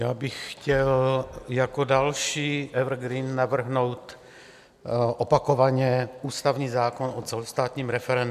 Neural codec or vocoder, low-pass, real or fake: none; 14.4 kHz; real